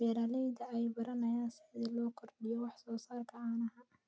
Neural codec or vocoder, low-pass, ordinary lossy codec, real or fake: none; none; none; real